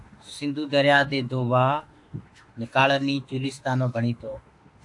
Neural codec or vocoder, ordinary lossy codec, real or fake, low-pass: autoencoder, 48 kHz, 32 numbers a frame, DAC-VAE, trained on Japanese speech; AAC, 64 kbps; fake; 10.8 kHz